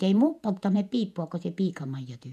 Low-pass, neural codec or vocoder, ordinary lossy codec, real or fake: 14.4 kHz; vocoder, 48 kHz, 128 mel bands, Vocos; none; fake